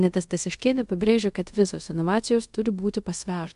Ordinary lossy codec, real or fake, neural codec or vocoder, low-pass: MP3, 64 kbps; fake; codec, 24 kHz, 0.5 kbps, DualCodec; 10.8 kHz